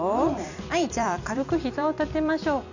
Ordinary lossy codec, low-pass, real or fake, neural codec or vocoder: none; 7.2 kHz; fake; vocoder, 44.1 kHz, 128 mel bands every 256 samples, BigVGAN v2